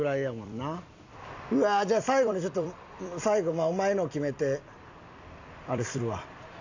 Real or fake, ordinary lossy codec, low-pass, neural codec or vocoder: fake; AAC, 48 kbps; 7.2 kHz; vocoder, 44.1 kHz, 128 mel bands every 256 samples, BigVGAN v2